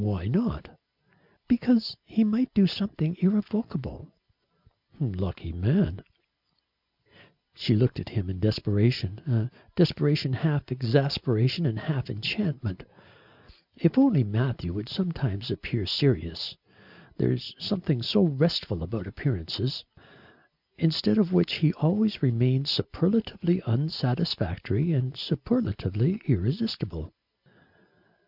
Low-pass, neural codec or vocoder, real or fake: 5.4 kHz; none; real